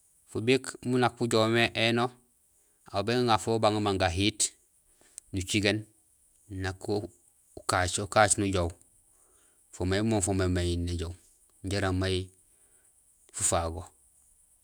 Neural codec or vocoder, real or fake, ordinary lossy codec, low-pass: autoencoder, 48 kHz, 128 numbers a frame, DAC-VAE, trained on Japanese speech; fake; none; none